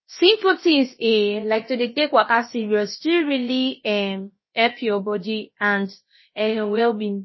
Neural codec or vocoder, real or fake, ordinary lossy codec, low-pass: codec, 16 kHz, about 1 kbps, DyCAST, with the encoder's durations; fake; MP3, 24 kbps; 7.2 kHz